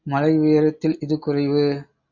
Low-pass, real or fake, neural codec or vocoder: 7.2 kHz; real; none